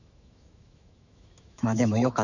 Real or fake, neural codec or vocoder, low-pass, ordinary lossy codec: fake; autoencoder, 48 kHz, 128 numbers a frame, DAC-VAE, trained on Japanese speech; 7.2 kHz; none